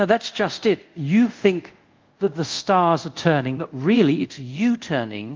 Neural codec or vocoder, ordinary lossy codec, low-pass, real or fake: codec, 24 kHz, 0.5 kbps, DualCodec; Opus, 24 kbps; 7.2 kHz; fake